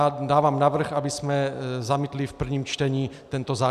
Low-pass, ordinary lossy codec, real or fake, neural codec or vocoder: 14.4 kHz; AAC, 96 kbps; real; none